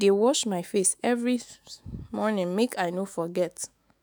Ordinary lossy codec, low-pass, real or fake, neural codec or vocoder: none; none; fake; autoencoder, 48 kHz, 128 numbers a frame, DAC-VAE, trained on Japanese speech